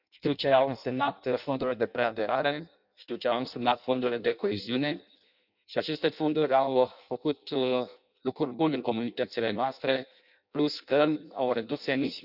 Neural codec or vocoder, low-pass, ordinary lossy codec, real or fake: codec, 16 kHz in and 24 kHz out, 0.6 kbps, FireRedTTS-2 codec; 5.4 kHz; none; fake